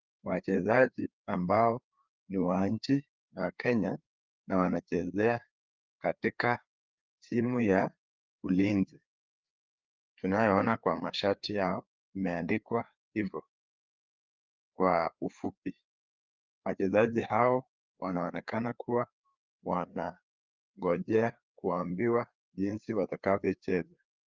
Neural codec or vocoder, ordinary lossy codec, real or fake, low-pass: codec, 16 kHz, 4 kbps, FreqCodec, larger model; Opus, 32 kbps; fake; 7.2 kHz